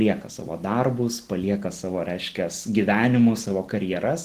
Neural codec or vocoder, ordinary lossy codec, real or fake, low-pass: none; Opus, 16 kbps; real; 14.4 kHz